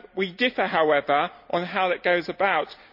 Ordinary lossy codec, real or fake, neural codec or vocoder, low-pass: none; real; none; 5.4 kHz